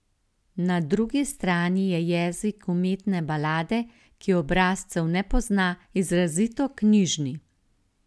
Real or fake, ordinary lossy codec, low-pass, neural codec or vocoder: real; none; none; none